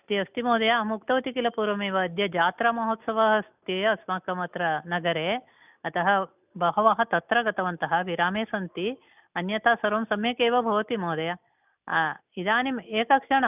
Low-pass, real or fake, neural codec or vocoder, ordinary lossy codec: 3.6 kHz; real; none; none